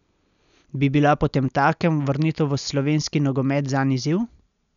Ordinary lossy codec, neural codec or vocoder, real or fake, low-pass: none; none; real; 7.2 kHz